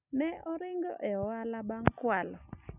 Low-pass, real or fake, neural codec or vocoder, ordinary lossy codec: 3.6 kHz; real; none; none